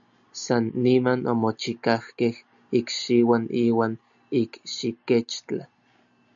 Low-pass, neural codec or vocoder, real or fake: 7.2 kHz; none; real